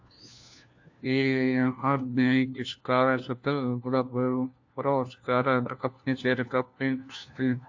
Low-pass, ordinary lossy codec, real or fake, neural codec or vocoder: 7.2 kHz; AAC, 48 kbps; fake; codec, 16 kHz, 1 kbps, FunCodec, trained on LibriTTS, 50 frames a second